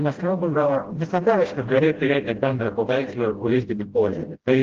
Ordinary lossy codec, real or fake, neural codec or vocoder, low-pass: Opus, 16 kbps; fake; codec, 16 kHz, 0.5 kbps, FreqCodec, smaller model; 7.2 kHz